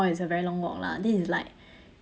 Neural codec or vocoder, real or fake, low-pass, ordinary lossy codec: none; real; none; none